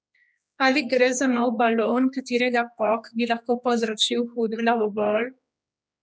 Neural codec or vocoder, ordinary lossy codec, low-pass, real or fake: codec, 16 kHz, 2 kbps, X-Codec, HuBERT features, trained on general audio; none; none; fake